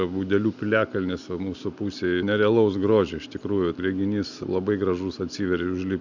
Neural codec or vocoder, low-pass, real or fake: none; 7.2 kHz; real